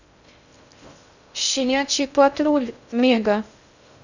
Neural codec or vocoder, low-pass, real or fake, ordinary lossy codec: codec, 16 kHz in and 24 kHz out, 0.6 kbps, FocalCodec, streaming, 2048 codes; 7.2 kHz; fake; none